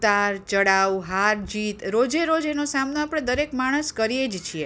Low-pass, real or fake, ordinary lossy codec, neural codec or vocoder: none; real; none; none